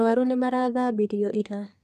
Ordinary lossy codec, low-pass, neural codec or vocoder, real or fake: none; 14.4 kHz; codec, 32 kHz, 1.9 kbps, SNAC; fake